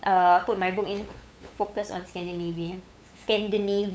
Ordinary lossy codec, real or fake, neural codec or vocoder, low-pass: none; fake; codec, 16 kHz, 8 kbps, FunCodec, trained on LibriTTS, 25 frames a second; none